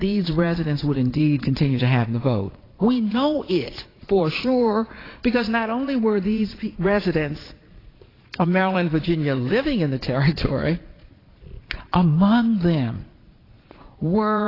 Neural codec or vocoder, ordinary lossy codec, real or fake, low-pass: vocoder, 22.05 kHz, 80 mel bands, Vocos; AAC, 24 kbps; fake; 5.4 kHz